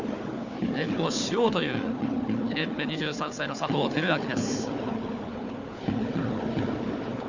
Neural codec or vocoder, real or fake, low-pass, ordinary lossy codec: codec, 16 kHz, 4 kbps, FunCodec, trained on Chinese and English, 50 frames a second; fake; 7.2 kHz; none